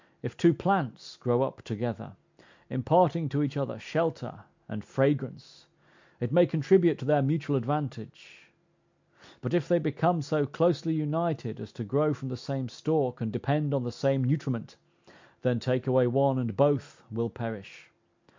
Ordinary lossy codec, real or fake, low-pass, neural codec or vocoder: MP3, 64 kbps; real; 7.2 kHz; none